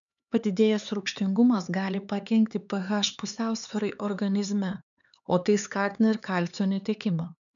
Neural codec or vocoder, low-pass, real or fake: codec, 16 kHz, 4 kbps, X-Codec, HuBERT features, trained on LibriSpeech; 7.2 kHz; fake